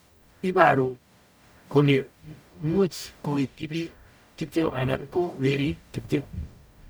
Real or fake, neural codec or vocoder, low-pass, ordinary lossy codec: fake; codec, 44.1 kHz, 0.9 kbps, DAC; none; none